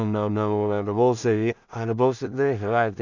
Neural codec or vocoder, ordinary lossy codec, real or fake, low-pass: codec, 16 kHz in and 24 kHz out, 0.4 kbps, LongCat-Audio-Codec, two codebook decoder; none; fake; 7.2 kHz